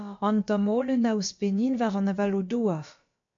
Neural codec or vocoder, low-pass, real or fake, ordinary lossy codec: codec, 16 kHz, about 1 kbps, DyCAST, with the encoder's durations; 7.2 kHz; fake; MP3, 48 kbps